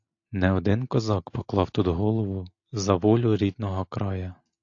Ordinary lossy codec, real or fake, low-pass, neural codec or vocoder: AAC, 48 kbps; real; 7.2 kHz; none